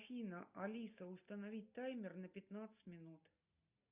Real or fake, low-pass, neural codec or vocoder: real; 3.6 kHz; none